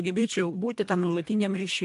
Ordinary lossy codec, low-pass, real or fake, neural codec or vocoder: AAC, 64 kbps; 10.8 kHz; fake; codec, 24 kHz, 1.5 kbps, HILCodec